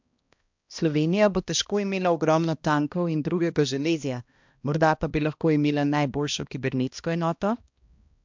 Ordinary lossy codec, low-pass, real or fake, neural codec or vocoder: MP3, 64 kbps; 7.2 kHz; fake; codec, 16 kHz, 1 kbps, X-Codec, HuBERT features, trained on balanced general audio